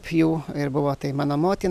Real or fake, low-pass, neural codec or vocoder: real; 14.4 kHz; none